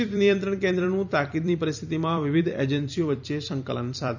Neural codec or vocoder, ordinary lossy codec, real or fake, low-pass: vocoder, 44.1 kHz, 128 mel bands every 256 samples, BigVGAN v2; none; fake; 7.2 kHz